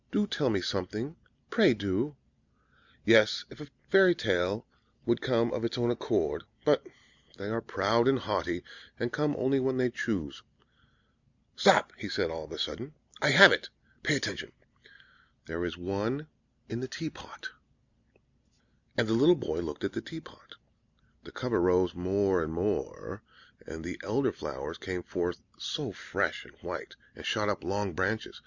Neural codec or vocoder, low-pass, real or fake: none; 7.2 kHz; real